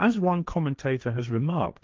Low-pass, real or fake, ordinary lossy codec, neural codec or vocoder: 7.2 kHz; fake; Opus, 32 kbps; codec, 16 kHz, 1.1 kbps, Voila-Tokenizer